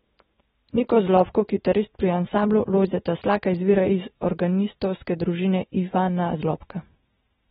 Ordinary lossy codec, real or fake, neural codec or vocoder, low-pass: AAC, 16 kbps; real; none; 19.8 kHz